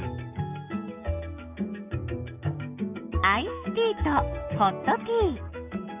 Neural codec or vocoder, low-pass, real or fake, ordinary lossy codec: codec, 16 kHz, 6 kbps, DAC; 3.6 kHz; fake; none